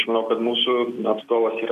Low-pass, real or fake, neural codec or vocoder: 14.4 kHz; real; none